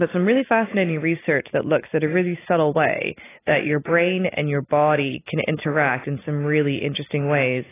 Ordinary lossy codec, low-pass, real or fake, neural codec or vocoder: AAC, 16 kbps; 3.6 kHz; real; none